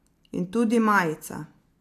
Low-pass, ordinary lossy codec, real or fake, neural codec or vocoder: 14.4 kHz; AAC, 64 kbps; real; none